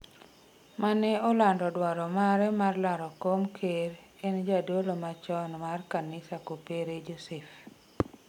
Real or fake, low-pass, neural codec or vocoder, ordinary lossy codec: real; 19.8 kHz; none; none